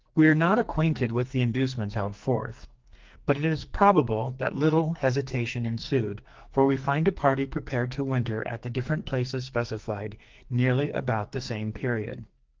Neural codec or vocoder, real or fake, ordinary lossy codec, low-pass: codec, 44.1 kHz, 2.6 kbps, SNAC; fake; Opus, 32 kbps; 7.2 kHz